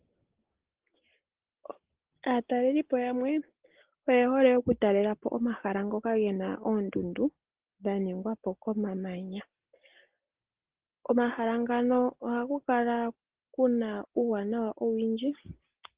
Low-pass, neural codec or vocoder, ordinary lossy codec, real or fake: 3.6 kHz; none; Opus, 16 kbps; real